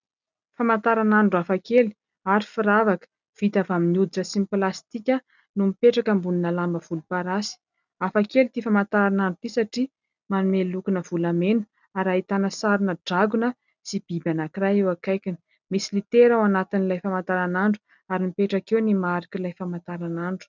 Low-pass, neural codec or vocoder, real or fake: 7.2 kHz; none; real